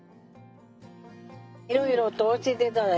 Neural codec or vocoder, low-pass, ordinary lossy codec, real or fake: none; none; none; real